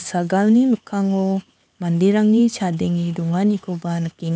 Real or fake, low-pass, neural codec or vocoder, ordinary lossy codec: fake; none; codec, 16 kHz, 4 kbps, X-Codec, HuBERT features, trained on LibriSpeech; none